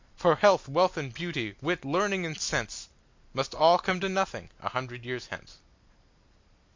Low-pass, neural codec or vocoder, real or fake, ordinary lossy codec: 7.2 kHz; none; real; MP3, 64 kbps